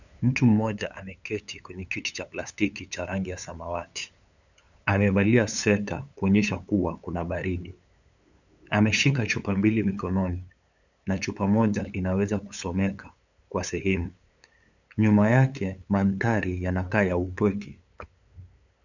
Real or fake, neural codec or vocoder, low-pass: fake; codec, 16 kHz, 8 kbps, FunCodec, trained on LibriTTS, 25 frames a second; 7.2 kHz